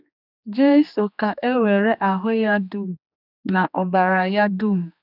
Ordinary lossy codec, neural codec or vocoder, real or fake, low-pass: none; codec, 16 kHz, 2 kbps, X-Codec, HuBERT features, trained on general audio; fake; 5.4 kHz